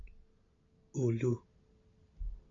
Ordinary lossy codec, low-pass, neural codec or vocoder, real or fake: AAC, 48 kbps; 7.2 kHz; none; real